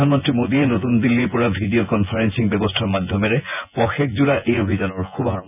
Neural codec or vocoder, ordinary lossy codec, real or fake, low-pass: vocoder, 24 kHz, 100 mel bands, Vocos; none; fake; 3.6 kHz